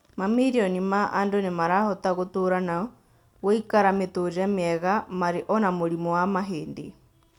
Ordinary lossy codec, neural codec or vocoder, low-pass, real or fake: none; none; 19.8 kHz; real